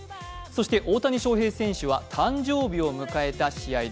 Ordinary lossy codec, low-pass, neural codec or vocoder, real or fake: none; none; none; real